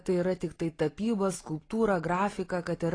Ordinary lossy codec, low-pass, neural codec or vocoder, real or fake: AAC, 32 kbps; 9.9 kHz; none; real